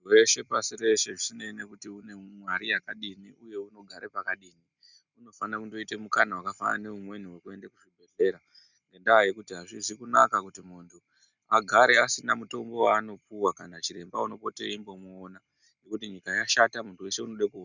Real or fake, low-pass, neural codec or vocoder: real; 7.2 kHz; none